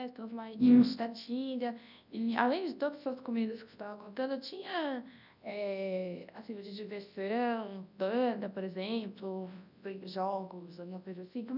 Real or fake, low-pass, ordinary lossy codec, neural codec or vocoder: fake; 5.4 kHz; none; codec, 24 kHz, 0.9 kbps, WavTokenizer, large speech release